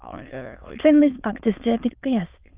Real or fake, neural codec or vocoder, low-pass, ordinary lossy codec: fake; autoencoder, 22.05 kHz, a latent of 192 numbers a frame, VITS, trained on many speakers; 3.6 kHz; Opus, 24 kbps